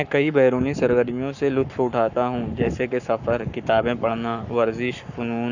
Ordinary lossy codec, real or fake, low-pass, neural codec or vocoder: none; fake; 7.2 kHz; codec, 44.1 kHz, 7.8 kbps, Pupu-Codec